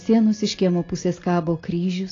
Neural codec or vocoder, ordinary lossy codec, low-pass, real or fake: none; AAC, 32 kbps; 7.2 kHz; real